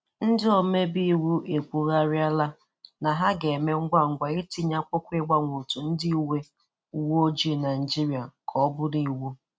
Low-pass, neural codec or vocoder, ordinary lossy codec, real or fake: none; none; none; real